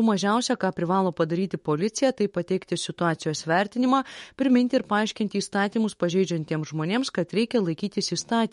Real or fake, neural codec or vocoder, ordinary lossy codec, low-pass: fake; autoencoder, 48 kHz, 128 numbers a frame, DAC-VAE, trained on Japanese speech; MP3, 48 kbps; 19.8 kHz